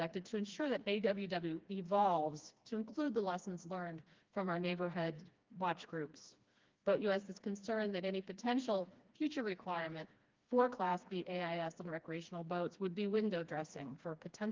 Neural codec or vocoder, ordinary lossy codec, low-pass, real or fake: codec, 16 kHz, 2 kbps, FreqCodec, smaller model; Opus, 32 kbps; 7.2 kHz; fake